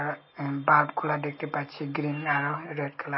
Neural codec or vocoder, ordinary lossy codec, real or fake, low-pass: none; MP3, 24 kbps; real; 7.2 kHz